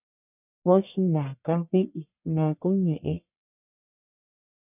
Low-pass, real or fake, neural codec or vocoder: 3.6 kHz; fake; codec, 44.1 kHz, 1.7 kbps, Pupu-Codec